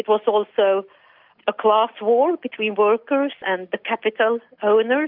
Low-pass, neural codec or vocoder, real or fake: 5.4 kHz; none; real